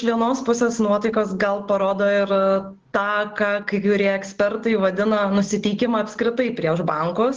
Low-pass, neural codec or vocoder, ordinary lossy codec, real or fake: 7.2 kHz; none; Opus, 16 kbps; real